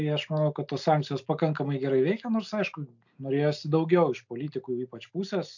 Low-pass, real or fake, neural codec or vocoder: 7.2 kHz; real; none